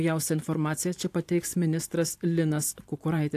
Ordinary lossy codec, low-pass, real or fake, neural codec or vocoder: AAC, 64 kbps; 14.4 kHz; fake; vocoder, 44.1 kHz, 128 mel bands every 512 samples, BigVGAN v2